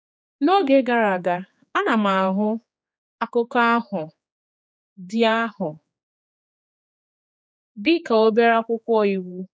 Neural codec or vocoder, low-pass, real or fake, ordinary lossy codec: codec, 16 kHz, 4 kbps, X-Codec, HuBERT features, trained on general audio; none; fake; none